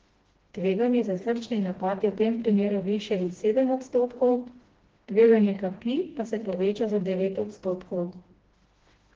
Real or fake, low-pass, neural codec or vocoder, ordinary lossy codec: fake; 7.2 kHz; codec, 16 kHz, 1 kbps, FreqCodec, smaller model; Opus, 16 kbps